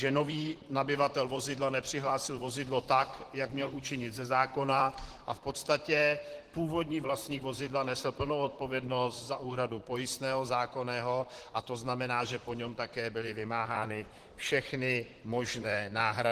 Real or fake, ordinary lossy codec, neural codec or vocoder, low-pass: fake; Opus, 16 kbps; vocoder, 44.1 kHz, 128 mel bands, Pupu-Vocoder; 14.4 kHz